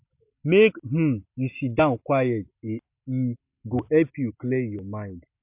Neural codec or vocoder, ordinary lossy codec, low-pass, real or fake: none; MP3, 32 kbps; 3.6 kHz; real